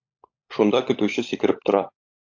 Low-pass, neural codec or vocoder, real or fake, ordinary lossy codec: 7.2 kHz; codec, 16 kHz, 16 kbps, FunCodec, trained on LibriTTS, 50 frames a second; fake; AAC, 48 kbps